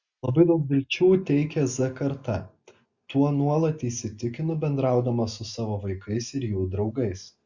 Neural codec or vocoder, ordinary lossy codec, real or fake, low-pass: none; Opus, 64 kbps; real; 7.2 kHz